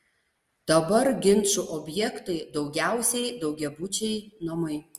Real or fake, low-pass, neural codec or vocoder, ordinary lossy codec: real; 14.4 kHz; none; Opus, 32 kbps